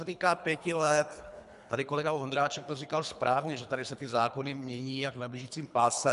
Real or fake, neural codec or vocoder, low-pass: fake; codec, 24 kHz, 3 kbps, HILCodec; 10.8 kHz